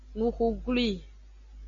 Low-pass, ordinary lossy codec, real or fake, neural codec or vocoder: 7.2 kHz; MP3, 48 kbps; real; none